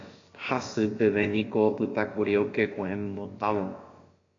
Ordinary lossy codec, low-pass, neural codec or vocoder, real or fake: AAC, 32 kbps; 7.2 kHz; codec, 16 kHz, about 1 kbps, DyCAST, with the encoder's durations; fake